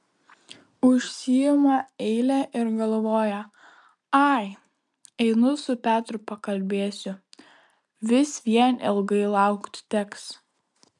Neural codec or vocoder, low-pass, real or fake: none; 10.8 kHz; real